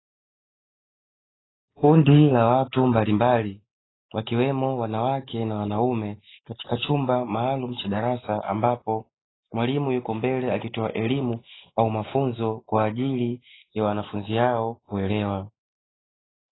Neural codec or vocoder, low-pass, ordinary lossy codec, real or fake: none; 7.2 kHz; AAC, 16 kbps; real